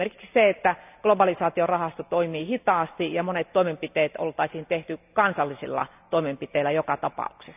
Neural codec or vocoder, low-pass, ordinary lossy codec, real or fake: none; 3.6 kHz; none; real